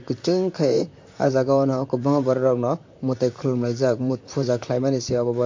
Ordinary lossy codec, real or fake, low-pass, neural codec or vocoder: MP3, 48 kbps; fake; 7.2 kHz; vocoder, 44.1 kHz, 128 mel bands, Pupu-Vocoder